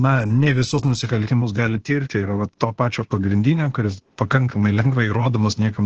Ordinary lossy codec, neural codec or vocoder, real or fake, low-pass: Opus, 16 kbps; codec, 16 kHz, 0.8 kbps, ZipCodec; fake; 7.2 kHz